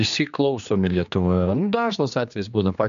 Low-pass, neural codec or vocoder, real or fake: 7.2 kHz; codec, 16 kHz, 2 kbps, X-Codec, HuBERT features, trained on general audio; fake